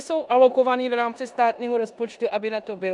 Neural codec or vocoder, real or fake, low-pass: codec, 16 kHz in and 24 kHz out, 0.9 kbps, LongCat-Audio-Codec, four codebook decoder; fake; 10.8 kHz